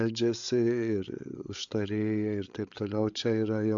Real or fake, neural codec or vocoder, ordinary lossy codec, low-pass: fake; codec, 16 kHz, 8 kbps, FreqCodec, larger model; AAC, 64 kbps; 7.2 kHz